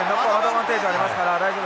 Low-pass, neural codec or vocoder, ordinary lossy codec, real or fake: none; none; none; real